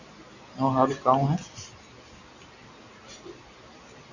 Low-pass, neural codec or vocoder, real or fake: 7.2 kHz; none; real